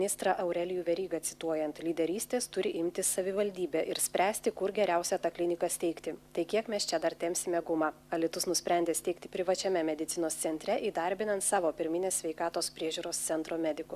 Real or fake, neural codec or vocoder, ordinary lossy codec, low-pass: real; none; Opus, 64 kbps; 14.4 kHz